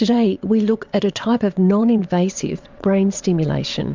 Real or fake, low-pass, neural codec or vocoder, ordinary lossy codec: fake; 7.2 kHz; vocoder, 22.05 kHz, 80 mel bands, WaveNeXt; MP3, 64 kbps